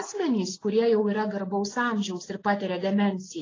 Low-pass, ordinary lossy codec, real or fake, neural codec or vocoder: 7.2 kHz; AAC, 32 kbps; real; none